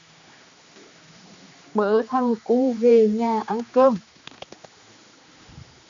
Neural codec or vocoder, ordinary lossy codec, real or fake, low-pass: codec, 16 kHz, 2 kbps, X-Codec, HuBERT features, trained on general audio; AAC, 64 kbps; fake; 7.2 kHz